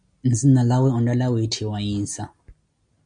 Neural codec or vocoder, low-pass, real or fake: none; 9.9 kHz; real